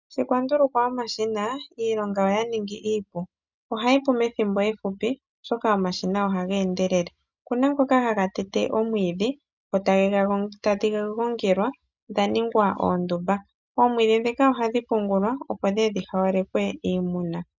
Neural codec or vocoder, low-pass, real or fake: none; 7.2 kHz; real